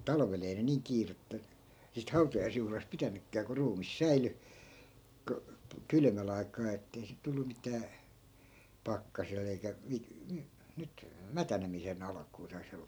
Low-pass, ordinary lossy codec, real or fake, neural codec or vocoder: none; none; real; none